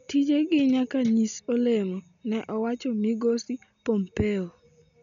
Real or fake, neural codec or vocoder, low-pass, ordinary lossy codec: real; none; 7.2 kHz; none